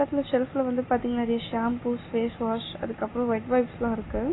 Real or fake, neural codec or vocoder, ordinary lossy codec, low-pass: real; none; AAC, 16 kbps; 7.2 kHz